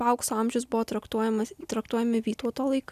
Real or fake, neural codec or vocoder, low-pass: real; none; 14.4 kHz